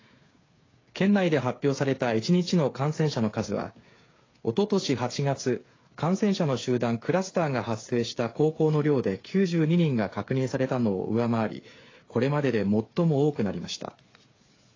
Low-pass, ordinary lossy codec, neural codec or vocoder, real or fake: 7.2 kHz; AAC, 32 kbps; codec, 16 kHz, 8 kbps, FreqCodec, smaller model; fake